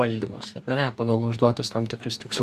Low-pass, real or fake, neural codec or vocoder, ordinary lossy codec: 14.4 kHz; fake; codec, 44.1 kHz, 2.6 kbps, DAC; Opus, 64 kbps